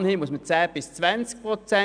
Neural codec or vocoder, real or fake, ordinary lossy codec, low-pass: none; real; none; 9.9 kHz